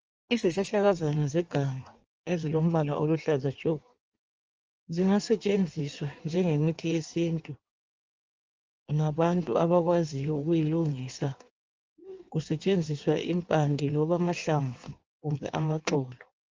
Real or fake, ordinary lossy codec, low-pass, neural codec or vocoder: fake; Opus, 24 kbps; 7.2 kHz; codec, 16 kHz in and 24 kHz out, 1.1 kbps, FireRedTTS-2 codec